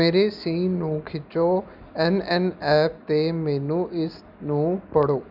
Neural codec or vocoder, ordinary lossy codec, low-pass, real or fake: none; none; 5.4 kHz; real